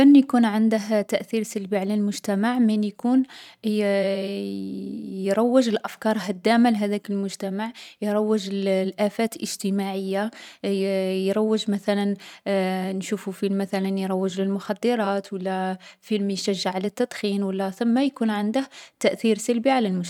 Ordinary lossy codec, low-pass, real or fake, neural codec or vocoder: none; 19.8 kHz; fake; vocoder, 44.1 kHz, 128 mel bands every 512 samples, BigVGAN v2